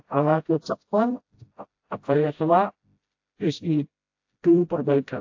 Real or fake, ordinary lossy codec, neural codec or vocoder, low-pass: fake; AAC, 48 kbps; codec, 16 kHz, 0.5 kbps, FreqCodec, smaller model; 7.2 kHz